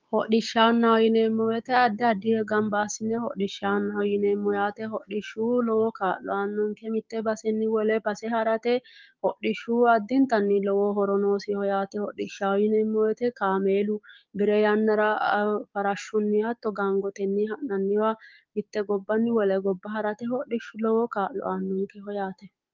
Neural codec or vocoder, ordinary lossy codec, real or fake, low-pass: codec, 16 kHz, 6 kbps, DAC; Opus, 32 kbps; fake; 7.2 kHz